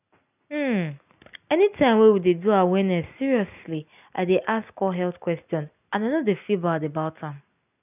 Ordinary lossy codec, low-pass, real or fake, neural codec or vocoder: none; 3.6 kHz; real; none